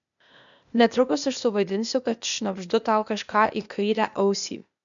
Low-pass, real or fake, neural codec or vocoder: 7.2 kHz; fake; codec, 16 kHz, 0.8 kbps, ZipCodec